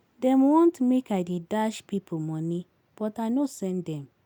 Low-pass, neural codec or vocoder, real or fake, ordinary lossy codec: 19.8 kHz; none; real; none